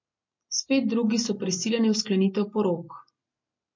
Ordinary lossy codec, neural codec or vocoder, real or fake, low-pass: MP3, 48 kbps; none; real; 7.2 kHz